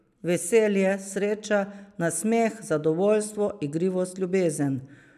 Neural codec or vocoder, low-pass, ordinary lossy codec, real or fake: none; 14.4 kHz; none; real